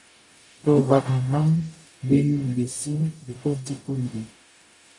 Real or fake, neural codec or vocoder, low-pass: fake; codec, 44.1 kHz, 0.9 kbps, DAC; 10.8 kHz